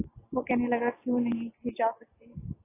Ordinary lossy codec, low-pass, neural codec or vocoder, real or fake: AAC, 16 kbps; 3.6 kHz; none; real